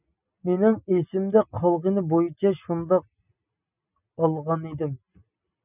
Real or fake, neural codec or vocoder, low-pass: real; none; 3.6 kHz